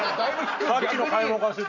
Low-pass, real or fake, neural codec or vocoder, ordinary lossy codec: 7.2 kHz; real; none; none